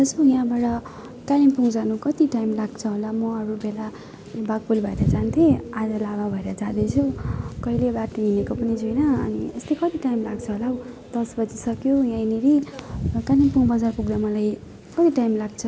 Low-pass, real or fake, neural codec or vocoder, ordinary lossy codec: none; real; none; none